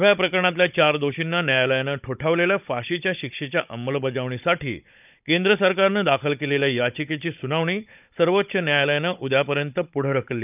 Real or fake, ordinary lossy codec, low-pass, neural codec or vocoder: fake; none; 3.6 kHz; codec, 16 kHz, 16 kbps, FunCodec, trained on Chinese and English, 50 frames a second